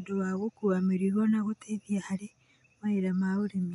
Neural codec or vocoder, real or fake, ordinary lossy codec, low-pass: none; real; none; 10.8 kHz